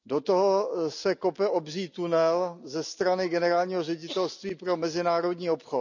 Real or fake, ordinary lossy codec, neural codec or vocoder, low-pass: real; none; none; 7.2 kHz